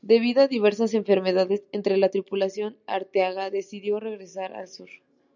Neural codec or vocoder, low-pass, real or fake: none; 7.2 kHz; real